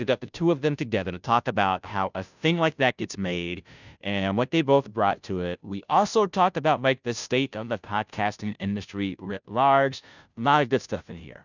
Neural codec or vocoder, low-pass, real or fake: codec, 16 kHz, 0.5 kbps, FunCodec, trained on Chinese and English, 25 frames a second; 7.2 kHz; fake